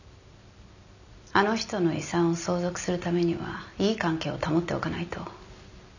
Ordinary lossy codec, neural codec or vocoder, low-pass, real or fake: none; none; 7.2 kHz; real